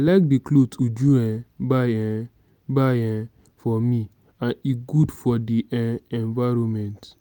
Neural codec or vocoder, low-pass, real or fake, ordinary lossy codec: none; 19.8 kHz; real; none